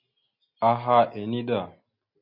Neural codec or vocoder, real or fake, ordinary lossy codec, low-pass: none; real; MP3, 32 kbps; 5.4 kHz